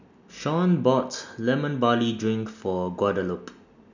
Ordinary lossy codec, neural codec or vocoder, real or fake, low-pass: none; none; real; 7.2 kHz